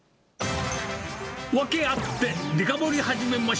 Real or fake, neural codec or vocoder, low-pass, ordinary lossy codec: real; none; none; none